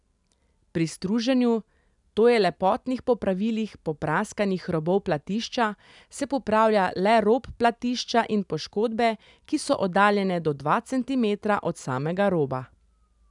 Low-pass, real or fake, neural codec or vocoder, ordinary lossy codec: 10.8 kHz; real; none; none